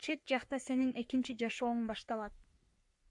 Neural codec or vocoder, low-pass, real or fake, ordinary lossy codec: codec, 44.1 kHz, 3.4 kbps, Pupu-Codec; 10.8 kHz; fake; AAC, 64 kbps